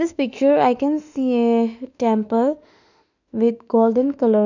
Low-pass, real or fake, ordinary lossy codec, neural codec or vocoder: 7.2 kHz; fake; none; autoencoder, 48 kHz, 32 numbers a frame, DAC-VAE, trained on Japanese speech